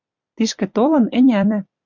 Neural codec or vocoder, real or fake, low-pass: vocoder, 44.1 kHz, 128 mel bands every 256 samples, BigVGAN v2; fake; 7.2 kHz